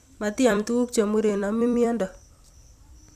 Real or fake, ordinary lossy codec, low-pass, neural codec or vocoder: fake; none; 14.4 kHz; vocoder, 44.1 kHz, 128 mel bands every 256 samples, BigVGAN v2